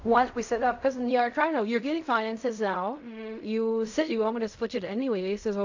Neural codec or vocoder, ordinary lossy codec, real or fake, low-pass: codec, 16 kHz in and 24 kHz out, 0.4 kbps, LongCat-Audio-Codec, fine tuned four codebook decoder; AAC, 48 kbps; fake; 7.2 kHz